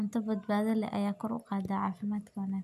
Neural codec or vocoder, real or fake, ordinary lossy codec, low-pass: none; real; AAC, 96 kbps; 14.4 kHz